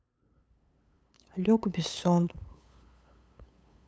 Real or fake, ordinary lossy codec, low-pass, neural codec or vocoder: fake; none; none; codec, 16 kHz, 8 kbps, FunCodec, trained on LibriTTS, 25 frames a second